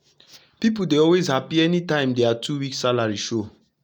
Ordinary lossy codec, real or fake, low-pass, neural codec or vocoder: none; real; 19.8 kHz; none